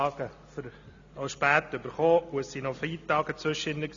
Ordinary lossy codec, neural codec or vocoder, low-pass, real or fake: AAC, 64 kbps; none; 7.2 kHz; real